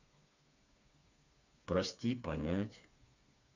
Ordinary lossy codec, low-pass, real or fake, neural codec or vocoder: none; 7.2 kHz; fake; codec, 16 kHz, 4 kbps, FreqCodec, smaller model